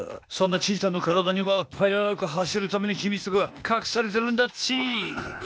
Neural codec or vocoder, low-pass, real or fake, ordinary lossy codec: codec, 16 kHz, 0.8 kbps, ZipCodec; none; fake; none